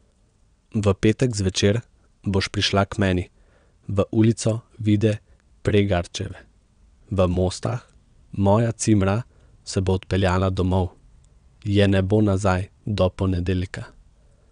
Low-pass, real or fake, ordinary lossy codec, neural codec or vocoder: 9.9 kHz; fake; none; vocoder, 22.05 kHz, 80 mel bands, WaveNeXt